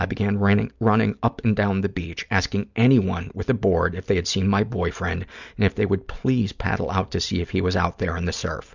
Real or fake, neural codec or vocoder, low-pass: real; none; 7.2 kHz